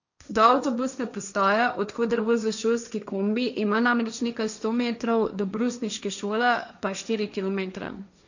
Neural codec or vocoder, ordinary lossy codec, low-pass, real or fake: codec, 16 kHz, 1.1 kbps, Voila-Tokenizer; none; 7.2 kHz; fake